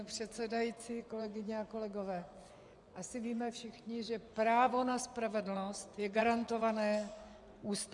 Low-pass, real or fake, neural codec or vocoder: 10.8 kHz; fake; vocoder, 24 kHz, 100 mel bands, Vocos